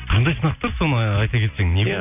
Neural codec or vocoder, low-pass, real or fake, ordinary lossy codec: none; 3.6 kHz; real; none